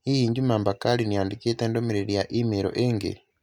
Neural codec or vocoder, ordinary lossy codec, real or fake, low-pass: none; none; real; 19.8 kHz